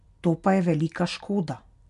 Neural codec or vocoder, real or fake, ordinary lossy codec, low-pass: none; real; MP3, 64 kbps; 10.8 kHz